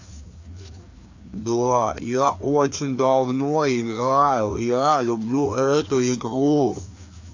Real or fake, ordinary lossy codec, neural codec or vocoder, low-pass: fake; AAC, 48 kbps; codec, 16 kHz, 2 kbps, FreqCodec, larger model; 7.2 kHz